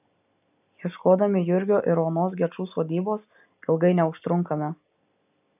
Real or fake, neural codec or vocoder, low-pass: real; none; 3.6 kHz